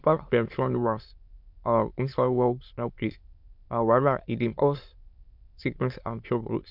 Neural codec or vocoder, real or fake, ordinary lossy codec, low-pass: autoencoder, 22.05 kHz, a latent of 192 numbers a frame, VITS, trained on many speakers; fake; MP3, 48 kbps; 5.4 kHz